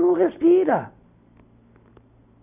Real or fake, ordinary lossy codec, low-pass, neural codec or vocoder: real; none; 3.6 kHz; none